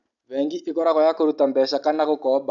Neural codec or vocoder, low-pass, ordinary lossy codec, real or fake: none; 7.2 kHz; none; real